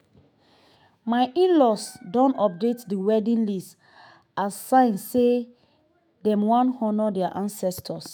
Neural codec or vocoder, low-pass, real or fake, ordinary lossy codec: autoencoder, 48 kHz, 128 numbers a frame, DAC-VAE, trained on Japanese speech; none; fake; none